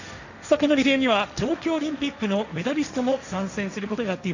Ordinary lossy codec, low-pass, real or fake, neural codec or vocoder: none; 7.2 kHz; fake; codec, 16 kHz, 1.1 kbps, Voila-Tokenizer